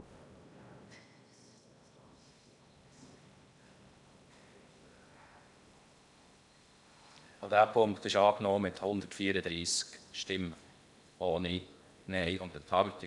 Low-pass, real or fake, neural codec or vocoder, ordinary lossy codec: 10.8 kHz; fake; codec, 16 kHz in and 24 kHz out, 0.8 kbps, FocalCodec, streaming, 65536 codes; none